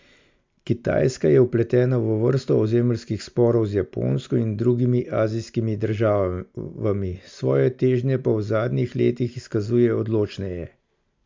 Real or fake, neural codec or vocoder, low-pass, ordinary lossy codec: real; none; 7.2 kHz; MP3, 64 kbps